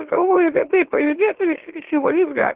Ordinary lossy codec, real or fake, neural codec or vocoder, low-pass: Opus, 16 kbps; fake; autoencoder, 44.1 kHz, a latent of 192 numbers a frame, MeloTTS; 3.6 kHz